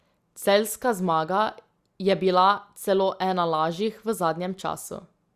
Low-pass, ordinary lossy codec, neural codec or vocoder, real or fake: 14.4 kHz; Opus, 64 kbps; none; real